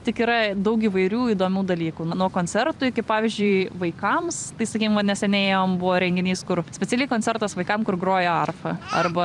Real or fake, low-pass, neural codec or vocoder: fake; 10.8 kHz; vocoder, 44.1 kHz, 128 mel bands every 256 samples, BigVGAN v2